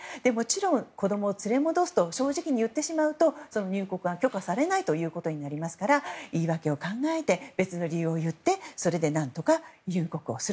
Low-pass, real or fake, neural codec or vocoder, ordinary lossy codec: none; real; none; none